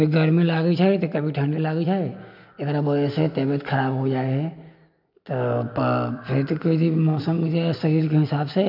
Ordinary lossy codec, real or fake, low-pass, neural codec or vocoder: none; real; 5.4 kHz; none